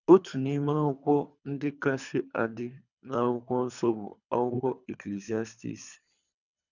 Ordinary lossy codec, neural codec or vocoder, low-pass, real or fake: none; codec, 24 kHz, 3 kbps, HILCodec; 7.2 kHz; fake